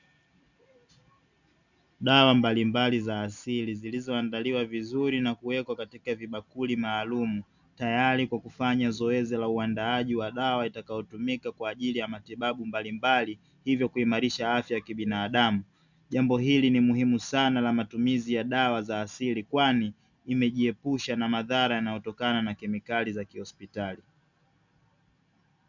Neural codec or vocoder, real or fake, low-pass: none; real; 7.2 kHz